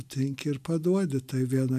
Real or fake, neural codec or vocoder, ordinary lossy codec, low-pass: real; none; AAC, 96 kbps; 14.4 kHz